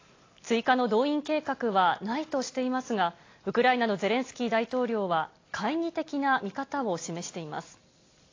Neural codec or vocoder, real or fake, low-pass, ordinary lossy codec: none; real; 7.2 kHz; AAC, 32 kbps